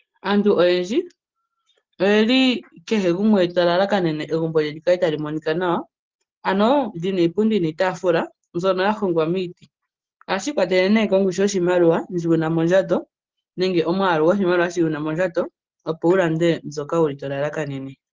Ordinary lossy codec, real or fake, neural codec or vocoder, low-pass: Opus, 16 kbps; real; none; 7.2 kHz